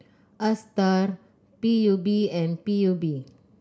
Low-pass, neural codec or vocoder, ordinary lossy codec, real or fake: none; none; none; real